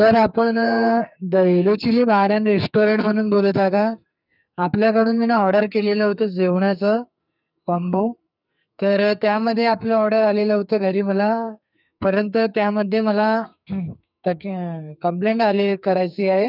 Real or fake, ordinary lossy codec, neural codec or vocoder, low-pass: fake; none; codec, 44.1 kHz, 2.6 kbps, SNAC; 5.4 kHz